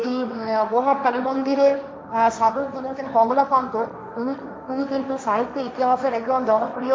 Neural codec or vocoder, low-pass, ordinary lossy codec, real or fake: codec, 16 kHz, 1.1 kbps, Voila-Tokenizer; none; none; fake